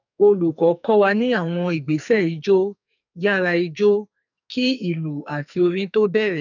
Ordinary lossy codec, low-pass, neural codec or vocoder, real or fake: none; 7.2 kHz; codec, 44.1 kHz, 2.6 kbps, SNAC; fake